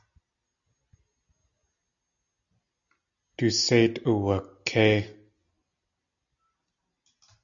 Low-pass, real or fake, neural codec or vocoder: 7.2 kHz; real; none